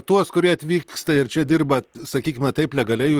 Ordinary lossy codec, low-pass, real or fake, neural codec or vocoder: Opus, 24 kbps; 19.8 kHz; fake; vocoder, 44.1 kHz, 128 mel bands, Pupu-Vocoder